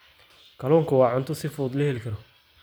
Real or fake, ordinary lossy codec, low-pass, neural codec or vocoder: real; none; none; none